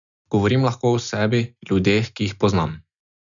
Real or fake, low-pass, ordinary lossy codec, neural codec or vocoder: real; 7.2 kHz; none; none